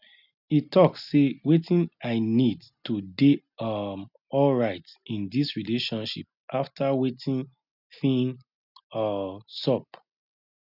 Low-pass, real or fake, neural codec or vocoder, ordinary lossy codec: 5.4 kHz; real; none; none